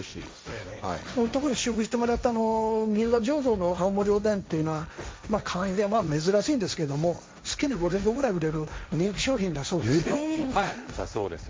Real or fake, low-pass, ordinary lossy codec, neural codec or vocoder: fake; none; none; codec, 16 kHz, 1.1 kbps, Voila-Tokenizer